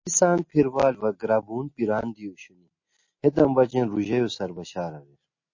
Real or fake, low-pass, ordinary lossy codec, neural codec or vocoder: real; 7.2 kHz; MP3, 32 kbps; none